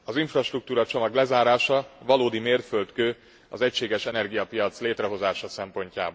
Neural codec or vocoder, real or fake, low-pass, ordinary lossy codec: none; real; none; none